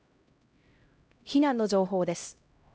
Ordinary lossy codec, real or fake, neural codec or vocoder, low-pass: none; fake; codec, 16 kHz, 0.5 kbps, X-Codec, HuBERT features, trained on LibriSpeech; none